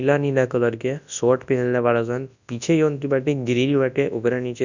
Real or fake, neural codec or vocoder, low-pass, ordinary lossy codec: fake; codec, 24 kHz, 0.9 kbps, WavTokenizer, large speech release; 7.2 kHz; none